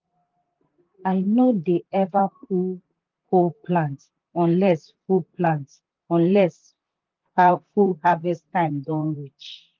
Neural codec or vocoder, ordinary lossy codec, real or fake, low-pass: vocoder, 44.1 kHz, 128 mel bands, Pupu-Vocoder; Opus, 32 kbps; fake; 7.2 kHz